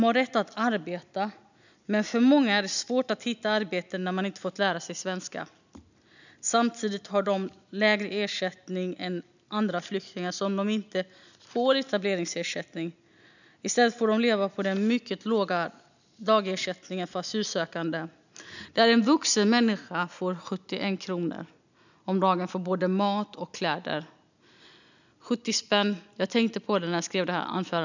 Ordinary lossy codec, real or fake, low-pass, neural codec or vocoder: none; real; 7.2 kHz; none